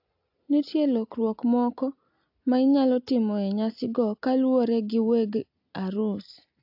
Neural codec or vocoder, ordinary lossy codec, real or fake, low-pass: none; AAC, 48 kbps; real; 5.4 kHz